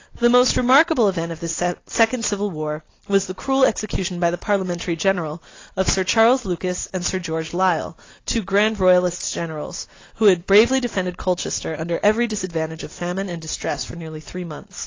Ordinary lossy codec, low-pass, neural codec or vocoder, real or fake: AAC, 32 kbps; 7.2 kHz; none; real